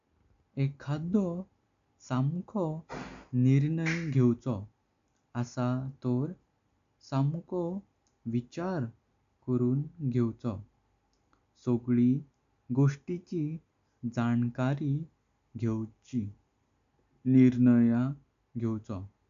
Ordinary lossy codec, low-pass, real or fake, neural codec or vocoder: AAC, 64 kbps; 7.2 kHz; real; none